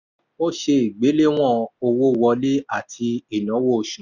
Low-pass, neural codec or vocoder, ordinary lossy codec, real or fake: 7.2 kHz; none; none; real